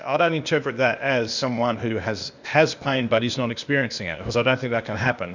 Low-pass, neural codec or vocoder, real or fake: 7.2 kHz; codec, 16 kHz, 0.8 kbps, ZipCodec; fake